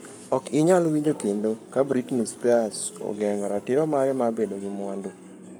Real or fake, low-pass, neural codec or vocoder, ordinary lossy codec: fake; none; codec, 44.1 kHz, 7.8 kbps, Pupu-Codec; none